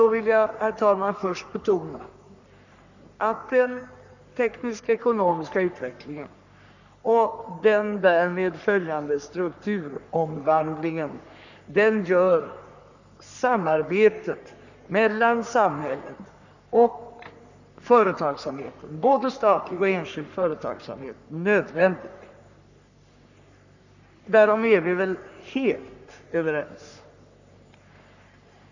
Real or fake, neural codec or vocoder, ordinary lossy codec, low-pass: fake; codec, 44.1 kHz, 3.4 kbps, Pupu-Codec; none; 7.2 kHz